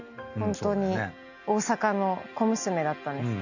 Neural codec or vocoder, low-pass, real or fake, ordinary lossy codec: none; 7.2 kHz; real; none